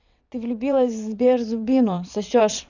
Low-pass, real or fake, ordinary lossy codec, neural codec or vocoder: 7.2 kHz; fake; none; vocoder, 22.05 kHz, 80 mel bands, WaveNeXt